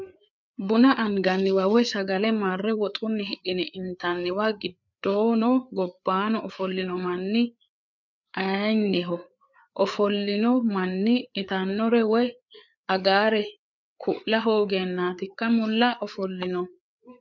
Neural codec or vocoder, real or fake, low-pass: codec, 16 kHz, 4 kbps, FreqCodec, larger model; fake; 7.2 kHz